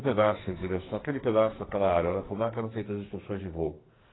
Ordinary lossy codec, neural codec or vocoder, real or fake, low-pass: AAC, 16 kbps; codec, 44.1 kHz, 2.6 kbps, SNAC; fake; 7.2 kHz